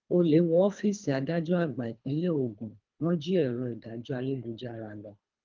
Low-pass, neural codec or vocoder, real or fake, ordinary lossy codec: 7.2 kHz; codec, 24 kHz, 3 kbps, HILCodec; fake; Opus, 24 kbps